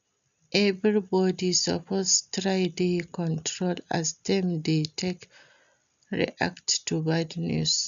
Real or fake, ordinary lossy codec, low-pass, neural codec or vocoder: real; none; 7.2 kHz; none